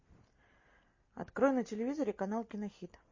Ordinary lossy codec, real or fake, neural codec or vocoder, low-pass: MP3, 32 kbps; real; none; 7.2 kHz